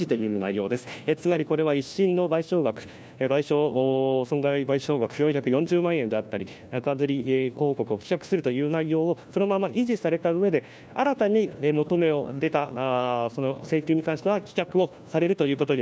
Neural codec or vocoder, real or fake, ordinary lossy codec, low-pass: codec, 16 kHz, 1 kbps, FunCodec, trained on LibriTTS, 50 frames a second; fake; none; none